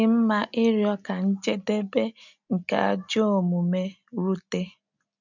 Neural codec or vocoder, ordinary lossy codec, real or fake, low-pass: none; none; real; 7.2 kHz